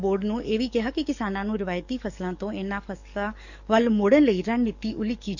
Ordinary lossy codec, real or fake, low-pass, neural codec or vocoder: none; fake; 7.2 kHz; codec, 44.1 kHz, 7.8 kbps, DAC